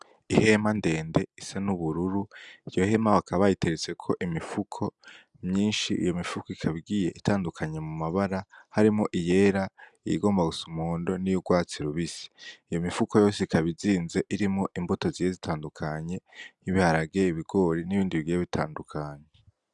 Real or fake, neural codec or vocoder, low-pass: real; none; 10.8 kHz